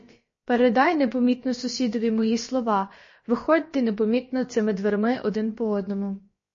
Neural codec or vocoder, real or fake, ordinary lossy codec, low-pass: codec, 16 kHz, about 1 kbps, DyCAST, with the encoder's durations; fake; MP3, 32 kbps; 7.2 kHz